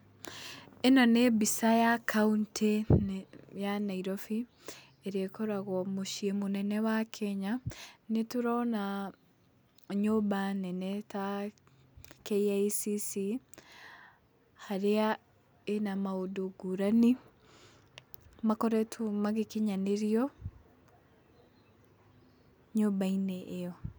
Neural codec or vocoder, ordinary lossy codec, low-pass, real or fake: none; none; none; real